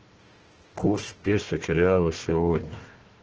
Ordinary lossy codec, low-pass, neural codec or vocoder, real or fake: Opus, 16 kbps; 7.2 kHz; codec, 16 kHz, 1 kbps, FunCodec, trained on Chinese and English, 50 frames a second; fake